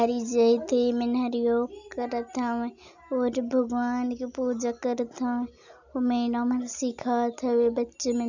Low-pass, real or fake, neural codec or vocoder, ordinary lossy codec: 7.2 kHz; real; none; none